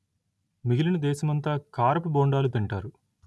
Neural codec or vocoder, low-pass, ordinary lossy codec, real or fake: none; none; none; real